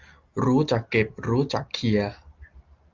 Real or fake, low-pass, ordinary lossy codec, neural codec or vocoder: real; 7.2 kHz; Opus, 24 kbps; none